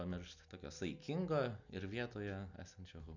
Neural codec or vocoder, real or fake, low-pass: none; real; 7.2 kHz